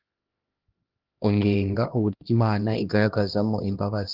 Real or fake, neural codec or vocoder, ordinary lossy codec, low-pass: fake; codec, 16 kHz, 1 kbps, X-Codec, HuBERT features, trained on LibriSpeech; Opus, 16 kbps; 5.4 kHz